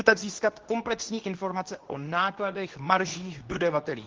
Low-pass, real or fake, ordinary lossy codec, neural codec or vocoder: 7.2 kHz; fake; Opus, 16 kbps; codec, 24 kHz, 0.9 kbps, WavTokenizer, medium speech release version 2